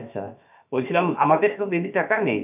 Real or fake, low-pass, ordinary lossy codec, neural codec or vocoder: fake; 3.6 kHz; none; codec, 16 kHz, about 1 kbps, DyCAST, with the encoder's durations